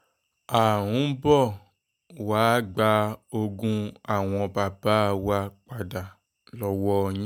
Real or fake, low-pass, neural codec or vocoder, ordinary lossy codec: real; 19.8 kHz; none; none